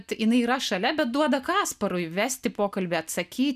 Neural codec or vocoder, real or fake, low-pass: none; real; 14.4 kHz